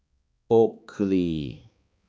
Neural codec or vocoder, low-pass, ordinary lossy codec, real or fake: codec, 16 kHz, 2 kbps, X-Codec, HuBERT features, trained on balanced general audio; none; none; fake